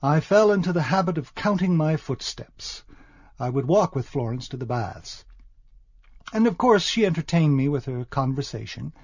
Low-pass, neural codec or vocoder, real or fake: 7.2 kHz; none; real